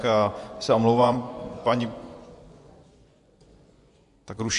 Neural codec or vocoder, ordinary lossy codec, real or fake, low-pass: vocoder, 24 kHz, 100 mel bands, Vocos; AAC, 64 kbps; fake; 10.8 kHz